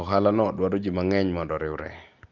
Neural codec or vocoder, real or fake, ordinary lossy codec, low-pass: none; real; Opus, 24 kbps; 7.2 kHz